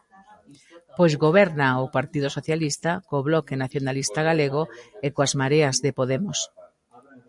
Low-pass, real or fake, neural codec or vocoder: 10.8 kHz; real; none